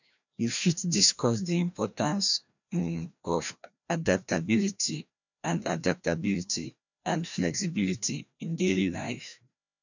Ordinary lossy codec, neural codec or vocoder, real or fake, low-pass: none; codec, 16 kHz, 1 kbps, FreqCodec, larger model; fake; 7.2 kHz